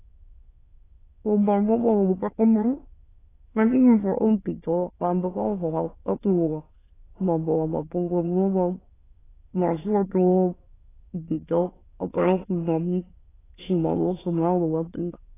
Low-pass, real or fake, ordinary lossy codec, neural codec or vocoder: 3.6 kHz; fake; AAC, 16 kbps; autoencoder, 22.05 kHz, a latent of 192 numbers a frame, VITS, trained on many speakers